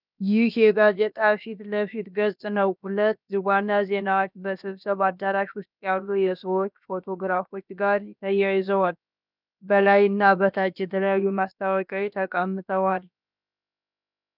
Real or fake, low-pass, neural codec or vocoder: fake; 5.4 kHz; codec, 16 kHz, about 1 kbps, DyCAST, with the encoder's durations